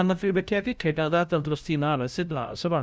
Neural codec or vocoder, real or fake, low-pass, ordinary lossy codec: codec, 16 kHz, 0.5 kbps, FunCodec, trained on LibriTTS, 25 frames a second; fake; none; none